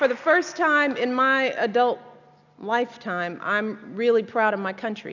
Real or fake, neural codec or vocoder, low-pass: real; none; 7.2 kHz